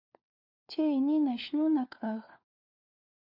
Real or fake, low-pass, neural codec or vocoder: fake; 5.4 kHz; codec, 16 kHz, 4 kbps, FunCodec, trained on LibriTTS, 50 frames a second